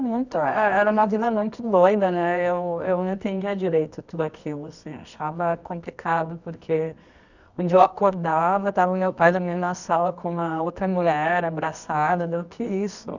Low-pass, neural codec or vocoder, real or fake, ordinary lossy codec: 7.2 kHz; codec, 24 kHz, 0.9 kbps, WavTokenizer, medium music audio release; fake; none